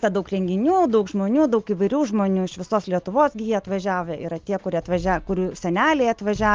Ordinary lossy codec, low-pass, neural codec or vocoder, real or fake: Opus, 32 kbps; 7.2 kHz; none; real